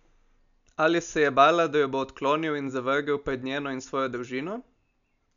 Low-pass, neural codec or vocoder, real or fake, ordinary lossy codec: 7.2 kHz; none; real; none